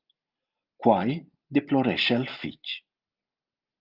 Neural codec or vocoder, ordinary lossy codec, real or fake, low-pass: none; Opus, 24 kbps; real; 5.4 kHz